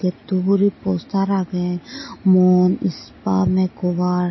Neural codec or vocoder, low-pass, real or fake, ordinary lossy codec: none; 7.2 kHz; real; MP3, 24 kbps